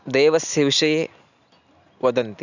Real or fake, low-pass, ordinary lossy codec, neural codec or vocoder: real; 7.2 kHz; none; none